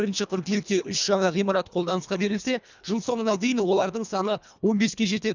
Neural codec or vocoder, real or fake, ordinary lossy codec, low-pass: codec, 24 kHz, 1.5 kbps, HILCodec; fake; none; 7.2 kHz